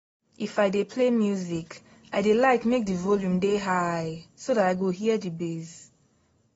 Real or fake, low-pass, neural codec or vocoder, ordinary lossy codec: real; 19.8 kHz; none; AAC, 24 kbps